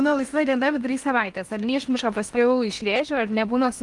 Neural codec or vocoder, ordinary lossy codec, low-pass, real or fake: codec, 16 kHz in and 24 kHz out, 0.8 kbps, FocalCodec, streaming, 65536 codes; Opus, 32 kbps; 10.8 kHz; fake